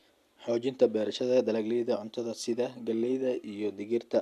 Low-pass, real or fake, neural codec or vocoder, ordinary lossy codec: 14.4 kHz; real; none; AAC, 96 kbps